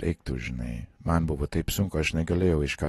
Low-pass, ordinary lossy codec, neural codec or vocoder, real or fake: 14.4 kHz; AAC, 32 kbps; none; real